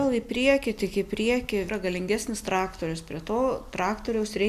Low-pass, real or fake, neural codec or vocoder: 14.4 kHz; real; none